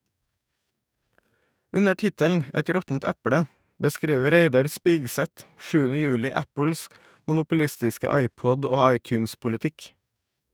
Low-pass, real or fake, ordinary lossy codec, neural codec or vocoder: none; fake; none; codec, 44.1 kHz, 2.6 kbps, DAC